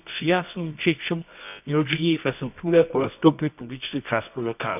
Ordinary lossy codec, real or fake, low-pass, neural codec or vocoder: none; fake; 3.6 kHz; codec, 24 kHz, 0.9 kbps, WavTokenizer, medium music audio release